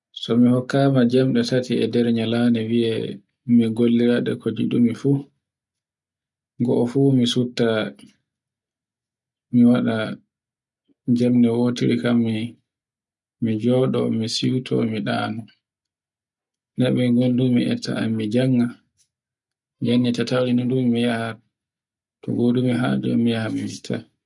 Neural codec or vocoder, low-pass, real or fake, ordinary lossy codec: none; 10.8 kHz; real; none